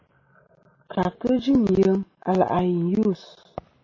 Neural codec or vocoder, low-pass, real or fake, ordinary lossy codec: none; 7.2 kHz; real; MP3, 32 kbps